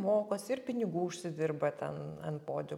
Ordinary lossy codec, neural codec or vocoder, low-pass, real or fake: MP3, 96 kbps; none; 19.8 kHz; real